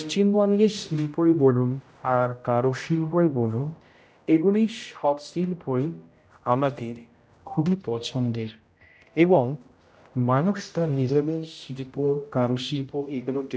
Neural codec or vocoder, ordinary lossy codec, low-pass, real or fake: codec, 16 kHz, 0.5 kbps, X-Codec, HuBERT features, trained on general audio; none; none; fake